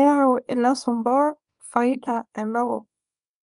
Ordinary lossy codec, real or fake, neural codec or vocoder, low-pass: none; fake; codec, 24 kHz, 0.9 kbps, WavTokenizer, small release; 10.8 kHz